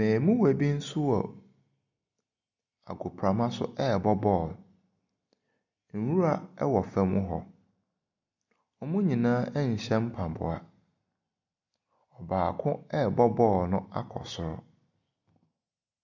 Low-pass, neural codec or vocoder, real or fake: 7.2 kHz; none; real